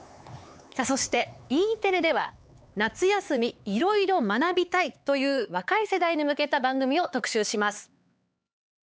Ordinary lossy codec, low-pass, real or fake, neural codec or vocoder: none; none; fake; codec, 16 kHz, 4 kbps, X-Codec, HuBERT features, trained on LibriSpeech